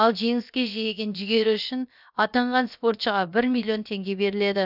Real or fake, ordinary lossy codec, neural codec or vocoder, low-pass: fake; none; codec, 16 kHz, about 1 kbps, DyCAST, with the encoder's durations; 5.4 kHz